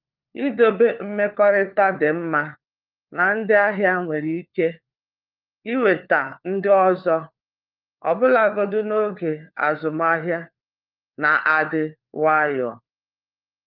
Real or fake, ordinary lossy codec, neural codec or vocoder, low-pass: fake; Opus, 24 kbps; codec, 16 kHz, 4 kbps, FunCodec, trained on LibriTTS, 50 frames a second; 5.4 kHz